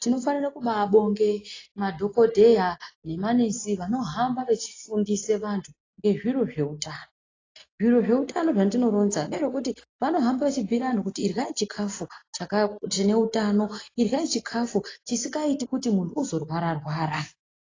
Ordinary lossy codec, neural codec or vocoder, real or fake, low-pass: AAC, 32 kbps; none; real; 7.2 kHz